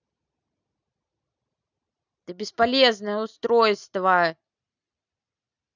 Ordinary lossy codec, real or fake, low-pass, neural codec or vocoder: none; real; 7.2 kHz; none